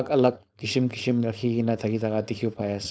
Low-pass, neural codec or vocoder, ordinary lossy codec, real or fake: none; codec, 16 kHz, 4.8 kbps, FACodec; none; fake